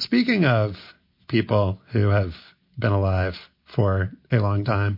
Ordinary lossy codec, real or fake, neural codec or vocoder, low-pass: MP3, 32 kbps; real; none; 5.4 kHz